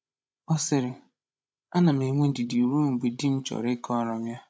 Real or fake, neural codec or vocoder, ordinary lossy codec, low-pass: fake; codec, 16 kHz, 16 kbps, FreqCodec, larger model; none; none